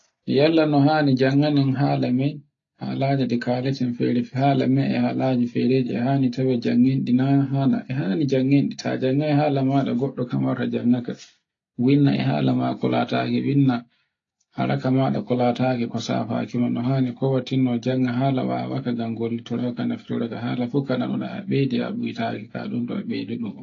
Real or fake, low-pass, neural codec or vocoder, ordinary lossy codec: real; 7.2 kHz; none; AAC, 32 kbps